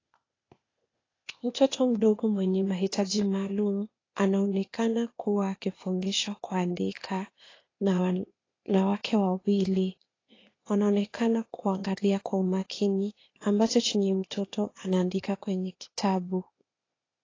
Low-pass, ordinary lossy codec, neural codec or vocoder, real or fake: 7.2 kHz; AAC, 32 kbps; codec, 16 kHz, 0.8 kbps, ZipCodec; fake